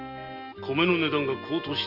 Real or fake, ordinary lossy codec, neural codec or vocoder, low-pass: real; Opus, 24 kbps; none; 5.4 kHz